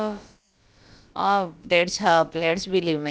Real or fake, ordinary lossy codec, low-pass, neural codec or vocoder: fake; none; none; codec, 16 kHz, about 1 kbps, DyCAST, with the encoder's durations